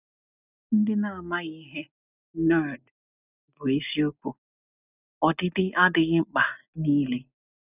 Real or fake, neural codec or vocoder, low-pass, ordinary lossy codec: real; none; 3.6 kHz; none